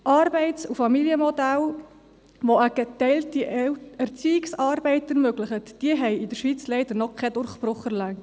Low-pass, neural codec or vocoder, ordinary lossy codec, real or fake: none; none; none; real